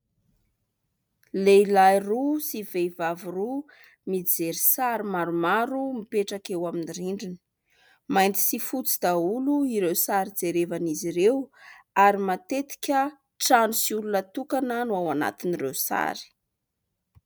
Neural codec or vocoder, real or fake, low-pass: none; real; 19.8 kHz